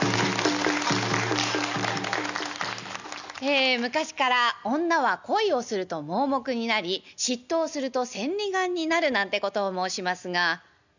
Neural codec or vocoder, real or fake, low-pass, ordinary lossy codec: none; real; 7.2 kHz; none